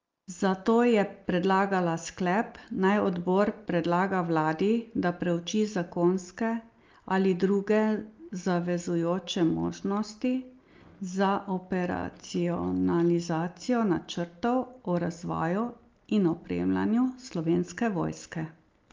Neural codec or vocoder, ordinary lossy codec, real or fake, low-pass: none; Opus, 32 kbps; real; 7.2 kHz